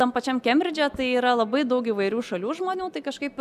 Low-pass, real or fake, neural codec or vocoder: 14.4 kHz; real; none